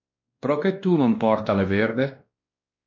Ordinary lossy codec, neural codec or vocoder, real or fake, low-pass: MP3, 48 kbps; codec, 16 kHz, 1 kbps, X-Codec, WavLM features, trained on Multilingual LibriSpeech; fake; 7.2 kHz